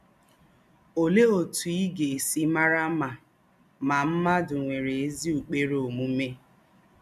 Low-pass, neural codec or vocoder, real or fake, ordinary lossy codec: 14.4 kHz; none; real; MP3, 96 kbps